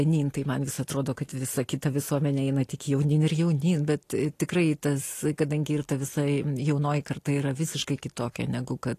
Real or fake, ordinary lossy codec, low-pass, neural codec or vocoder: real; AAC, 48 kbps; 14.4 kHz; none